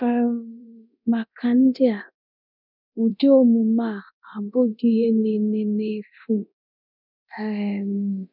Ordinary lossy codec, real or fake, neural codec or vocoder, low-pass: none; fake; codec, 24 kHz, 0.9 kbps, DualCodec; 5.4 kHz